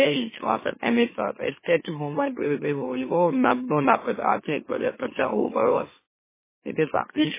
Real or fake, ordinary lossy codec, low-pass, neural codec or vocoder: fake; MP3, 16 kbps; 3.6 kHz; autoencoder, 44.1 kHz, a latent of 192 numbers a frame, MeloTTS